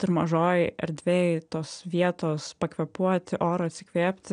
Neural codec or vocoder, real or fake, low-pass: none; real; 9.9 kHz